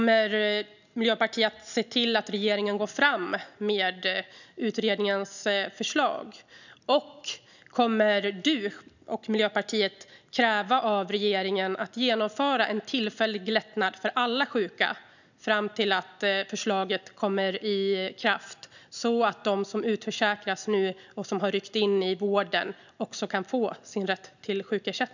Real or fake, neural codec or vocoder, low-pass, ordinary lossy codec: real; none; 7.2 kHz; none